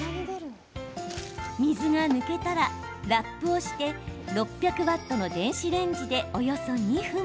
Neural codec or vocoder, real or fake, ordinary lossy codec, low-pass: none; real; none; none